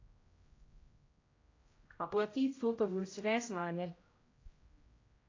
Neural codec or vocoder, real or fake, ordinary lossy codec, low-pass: codec, 16 kHz, 0.5 kbps, X-Codec, HuBERT features, trained on general audio; fake; AAC, 32 kbps; 7.2 kHz